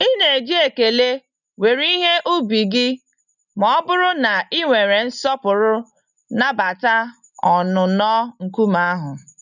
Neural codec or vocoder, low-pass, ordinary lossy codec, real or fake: none; 7.2 kHz; none; real